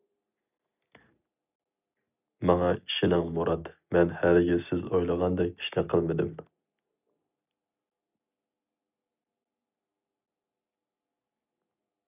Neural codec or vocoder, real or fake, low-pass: none; real; 3.6 kHz